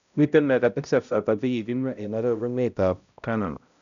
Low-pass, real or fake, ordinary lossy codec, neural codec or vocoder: 7.2 kHz; fake; MP3, 96 kbps; codec, 16 kHz, 0.5 kbps, X-Codec, HuBERT features, trained on balanced general audio